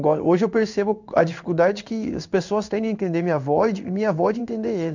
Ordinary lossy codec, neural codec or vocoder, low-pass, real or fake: none; codec, 16 kHz in and 24 kHz out, 1 kbps, XY-Tokenizer; 7.2 kHz; fake